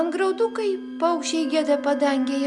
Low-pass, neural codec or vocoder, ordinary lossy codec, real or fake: 10.8 kHz; none; Opus, 64 kbps; real